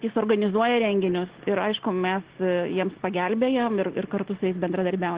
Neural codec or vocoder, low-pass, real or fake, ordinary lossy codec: none; 3.6 kHz; real; Opus, 16 kbps